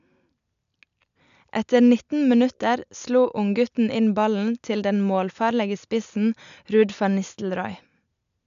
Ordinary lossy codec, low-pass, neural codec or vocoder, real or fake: none; 7.2 kHz; none; real